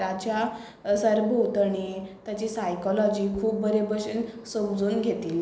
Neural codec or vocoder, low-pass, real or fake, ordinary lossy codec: none; none; real; none